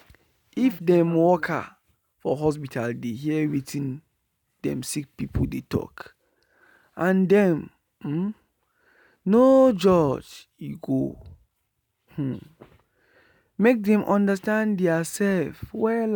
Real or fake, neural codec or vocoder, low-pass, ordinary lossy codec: real; none; none; none